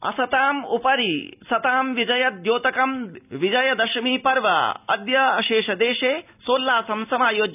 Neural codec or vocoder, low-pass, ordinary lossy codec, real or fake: none; 3.6 kHz; none; real